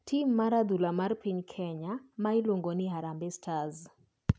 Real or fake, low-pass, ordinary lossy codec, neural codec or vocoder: real; none; none; none